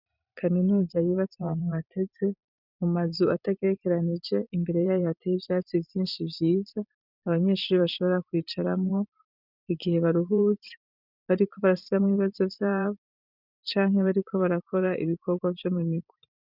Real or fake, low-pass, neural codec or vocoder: fake; 5.4 kHz; vocoder, 44.1 kHz, 128 mel bands every 512 samples, BigVGAN v2